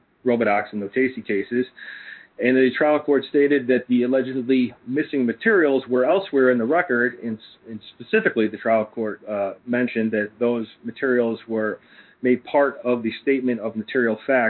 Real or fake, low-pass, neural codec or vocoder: fake; 5.4 kHz; codec, 16 kHz in and 24 kHz out, 1 kbps, XY-Tokenizer